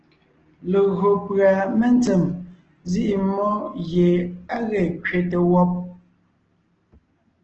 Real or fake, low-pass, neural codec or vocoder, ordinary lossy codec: real; 7.2 kHz; none; Opus, 16 kbps